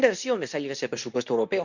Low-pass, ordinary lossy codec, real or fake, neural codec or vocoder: 7.2 kHz; none; fake; codec, 24 kHz, 0.9 kbps, WavTokenizer, medium speech release version 2